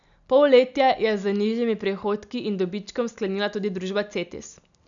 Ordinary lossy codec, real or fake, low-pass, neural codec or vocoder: none; real; 7.2 kHz; none